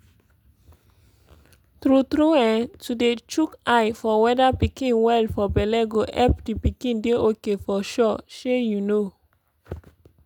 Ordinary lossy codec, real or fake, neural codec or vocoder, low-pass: none; real; none; 19.8 kHz